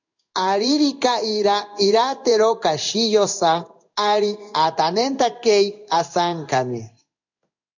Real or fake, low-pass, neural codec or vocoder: fake; 7.2 kHz; codec, 16 kHz in and 24 kHz out, 1 kbps, XY-Tokenizer